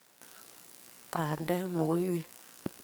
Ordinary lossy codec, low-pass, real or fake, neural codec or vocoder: none; none; fake; codec, 44.1 kHz, 2.6 kbps, SNAC